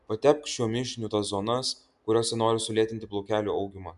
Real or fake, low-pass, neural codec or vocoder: real; 10.8 kHz; none